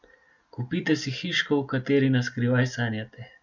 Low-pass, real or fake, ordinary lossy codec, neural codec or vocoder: 7.2 kHz; real; none; none